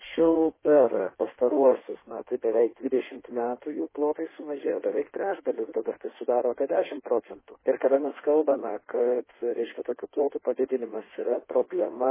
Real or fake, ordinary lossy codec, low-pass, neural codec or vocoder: fake; MP3, 16 kbps; 3.6 kHz; codec, 16 kHz in and 24 kHz out, 1.1 kbps, FireRedTTS-2 codec